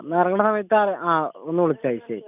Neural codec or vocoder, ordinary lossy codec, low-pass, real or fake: none; none; 3.6 kHz; real